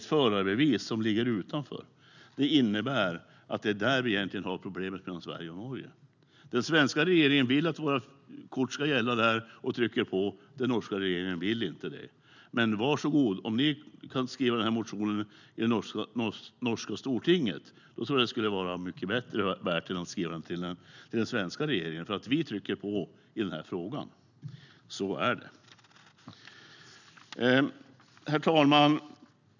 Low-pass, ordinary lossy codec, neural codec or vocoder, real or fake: 7.2 kHz; none; none; real